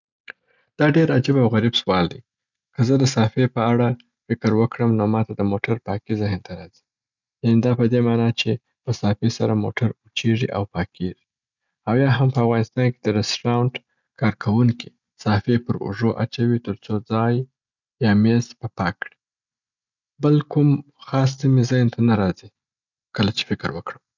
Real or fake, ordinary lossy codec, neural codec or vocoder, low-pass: real; none; none; 7.2 kHz